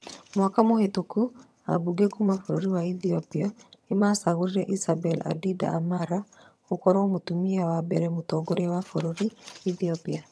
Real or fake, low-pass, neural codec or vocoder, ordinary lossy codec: fake; none; vocoder, 22.05 kHz, 80 mel bands, HiFi-GAN; none